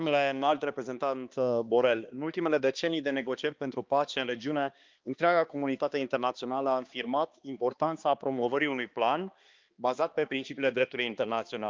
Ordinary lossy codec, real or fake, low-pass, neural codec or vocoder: Opus, 24 kbps; fake; 7.2 kHz; codec, 16 kHz, 2 kbps, X-Codec, HuBERT features, trained on balanced general audio